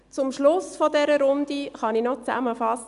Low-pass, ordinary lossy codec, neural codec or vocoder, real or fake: 10.8 kHz; none; none; real